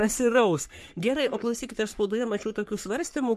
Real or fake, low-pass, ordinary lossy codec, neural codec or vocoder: fake; 14.4 kHz; MP3, 64 kbps; codec, 44.1 kHz, 3.4 kbps, Pupu-Codec